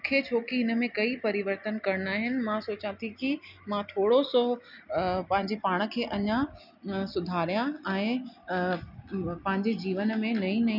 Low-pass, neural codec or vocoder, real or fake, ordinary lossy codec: 5.4 kHz; none; real; none